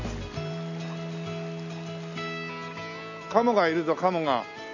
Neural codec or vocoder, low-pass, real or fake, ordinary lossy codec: none; 7.2 kHz; real; none